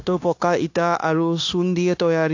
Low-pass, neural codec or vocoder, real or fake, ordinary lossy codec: 7.2 kHz; codec, 16 kHz, 0.9 kbps, LongCat-Audio-Codec; fake; AAC, 48 kbps